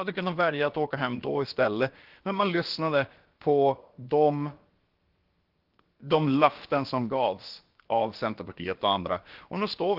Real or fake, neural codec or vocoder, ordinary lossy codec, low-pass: fake; codec, 16 kHz, about 1 kbps, DyCAST, with the encoder's durations; Opus, 16 kbps; 5.4 kHz